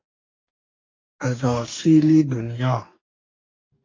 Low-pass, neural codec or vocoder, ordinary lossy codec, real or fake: 7.2 kHz; codec, 44.1 kHz, 2.6 kbps, DAC; AAC, 32 kbps; fake